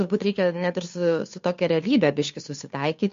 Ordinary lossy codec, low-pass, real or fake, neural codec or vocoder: MP3, 48 kbps; 7.2 kHz; fake; codec, 16 kHz, 2 kbps, FunCodec, trained on Chinese and English, 25 frames a second